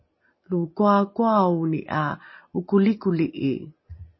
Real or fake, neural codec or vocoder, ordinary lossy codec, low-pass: real; none; MP3, 24 kbps; 7.2 kHz